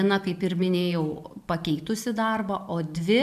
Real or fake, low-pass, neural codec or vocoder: real; 14.4 kHz; none